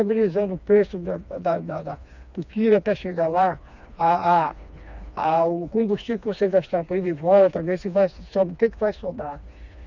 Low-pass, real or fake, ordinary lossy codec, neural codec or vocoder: 7.2 kHz; fake; none; codec, 16 kHz, 2 kbps, FreqCodec, smaller model